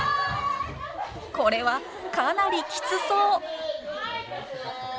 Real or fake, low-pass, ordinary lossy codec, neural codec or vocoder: real; none; none; none